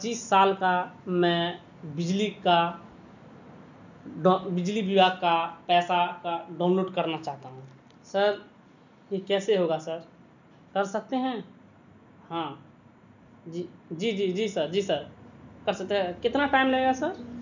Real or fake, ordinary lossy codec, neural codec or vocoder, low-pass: real; none; none; 7.2 kHz